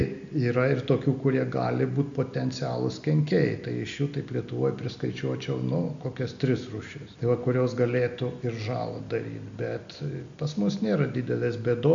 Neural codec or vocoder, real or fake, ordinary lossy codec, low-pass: none; real; MP3, 64 kbps; 7.2 kHz